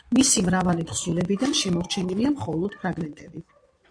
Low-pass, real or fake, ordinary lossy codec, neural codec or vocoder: 9.9 kHz; fake; AAC, 48 kbps; vocoder, 22.05 kHz, 80 mel bands, Vocos